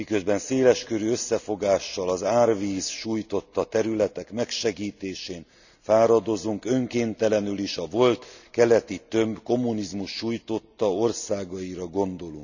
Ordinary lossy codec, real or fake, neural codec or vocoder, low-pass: none; real; none; 7.2 kHz